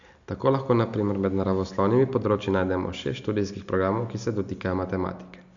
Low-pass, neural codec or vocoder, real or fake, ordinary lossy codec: 7.2 kHz; none; real; AAC, 64 kbps